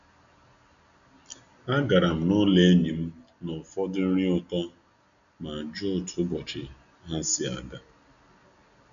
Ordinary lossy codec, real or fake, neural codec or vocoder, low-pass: none; real; none; 7.2 kHz